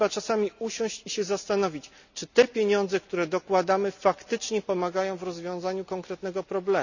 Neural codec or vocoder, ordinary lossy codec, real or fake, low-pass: none; none; real; 7.2 kHz